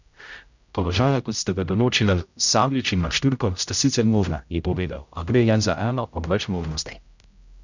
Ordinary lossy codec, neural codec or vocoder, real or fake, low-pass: none; codec, 16 kHz, 0.5 kbps, X-Codec, HuBERT features, trained on general audio; fake; 7.2 kHz